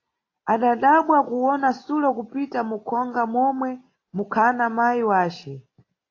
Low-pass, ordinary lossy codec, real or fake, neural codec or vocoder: 7.2 kHz; AAC, 32 kbps; real; none